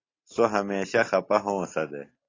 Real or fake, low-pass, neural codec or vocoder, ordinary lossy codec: real; 7.2 kHz; none; AAC, 32 kbps